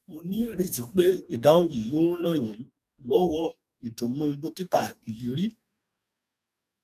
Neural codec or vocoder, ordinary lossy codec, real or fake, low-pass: codec, 44.1 kHz, 2.6 kbps, DAC; none; fake; 14.4 kHz